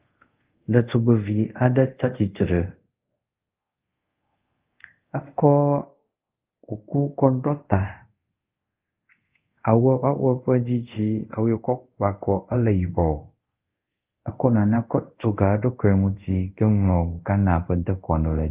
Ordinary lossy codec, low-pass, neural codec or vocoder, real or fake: Opus, 32 kbps; 3.6 kHz; codec, 24 kHz, 0.5 kbps, DualCodec; fake